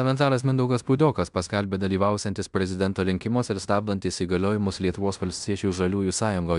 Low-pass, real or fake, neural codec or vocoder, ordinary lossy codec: 10.8 kHz; fake; codec, 16 kHz in and 24 kHz out, 0.9 kbps, LongCat-Audio-Codec, fine tuned four codebook decoder; MP3, 96 kbps